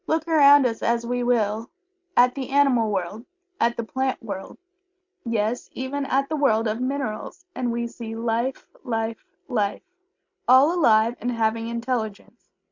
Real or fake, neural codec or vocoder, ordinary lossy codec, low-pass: real; none; MP3, 48 kbps; 7.2 kHz